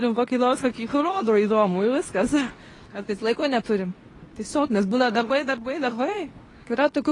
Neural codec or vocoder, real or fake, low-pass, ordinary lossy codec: codec, 24 kHz, 0.9 kbps, WavTokenizer, medium speech release version 2; fake; 10.8 kHz; AAC, 32 kbps